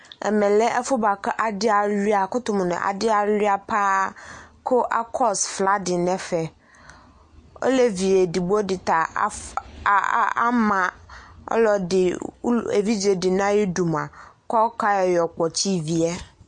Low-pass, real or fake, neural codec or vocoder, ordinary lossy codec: 9.9 kHz; real; none; MP3, 48 kbps